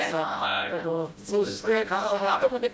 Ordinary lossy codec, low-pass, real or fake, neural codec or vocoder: none; none; fake; codec, 16 kHz, 0.5 kbps, FreqCodec, smaller model